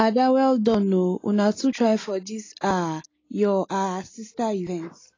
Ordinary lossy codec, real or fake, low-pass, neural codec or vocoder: AAC, 32 kbps; real; 7.2 kHz; none